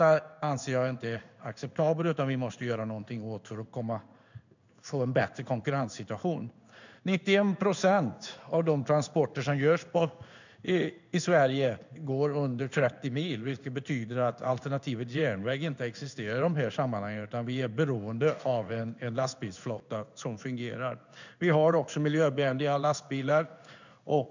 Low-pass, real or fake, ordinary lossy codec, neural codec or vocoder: 7.2 kHz; fake; none; codec, 16 kHz in and 24 kHz out, 1 kbps, XY-Tokenizer